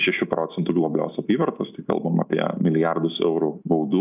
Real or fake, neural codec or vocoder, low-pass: real; none; 3.6 kHz